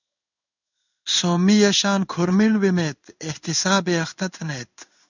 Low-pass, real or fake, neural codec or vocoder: 7.2 kHz; fake; codec, 16 kHz in and 24 kHz out, 1 kbps, XY-Tokenizer